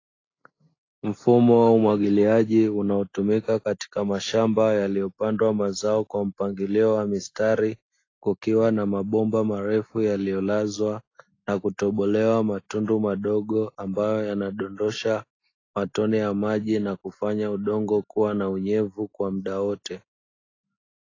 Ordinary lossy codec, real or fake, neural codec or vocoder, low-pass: AAC, 32 kbps; real; none; 7.2 kHz